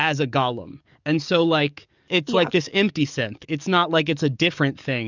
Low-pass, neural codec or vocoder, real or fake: 7.2 kHz; codec, 44.1 kHz, 7.8 kbps, DAC; fake